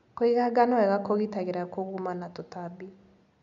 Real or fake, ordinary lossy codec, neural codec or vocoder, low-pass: real; none; none; 7.2 kHz